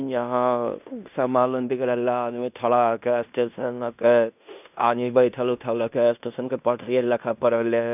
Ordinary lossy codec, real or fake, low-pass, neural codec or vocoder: none; fake; 3.6 kHz; codec, 16 kHz in and 24 kHz out, 0.9 kbps, LongCat-Audio-Codec, fine tuned four codebook decoder